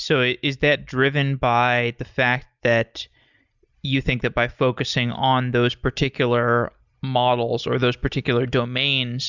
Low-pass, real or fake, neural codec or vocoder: 7.2 kHz; real; none